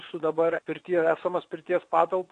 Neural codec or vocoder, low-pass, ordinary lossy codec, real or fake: vocoder, 22.05 kHz, 80 mel bands, WaveNeXt; 9.9 kHz; AAC, 48 kbps; fake